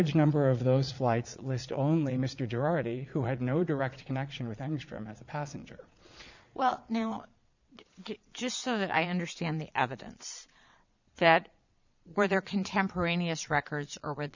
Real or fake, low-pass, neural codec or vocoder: fake; 7.2 kHz; vocoder, 22.05 kHz, 80 mel bands, Vocos